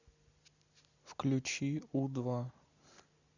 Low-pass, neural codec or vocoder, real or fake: 7.2 kHz; none; real